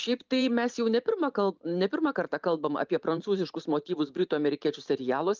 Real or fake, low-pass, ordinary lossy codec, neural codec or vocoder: fake; 7.2 kHz; Opus, 24 kbps; vocoder, 44.1 kHz, 128 mel bands every 512 samples, BigVGAN v2